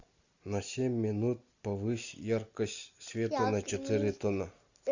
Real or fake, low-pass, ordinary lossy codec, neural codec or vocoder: real; 7.2 kHz; Opus, 64 kbps; none